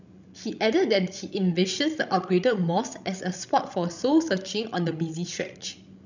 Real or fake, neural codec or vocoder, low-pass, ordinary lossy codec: fake; codec, 16 kHz, 16 kbps, FreqCodec, larger model; 7.2 kHz; none